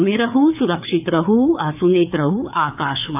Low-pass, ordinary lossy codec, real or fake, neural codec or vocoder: 3.6 kHz; AAC, 32 kbps; fake; codec, 16 kHz, 4 kbps, FreqCodec, larger model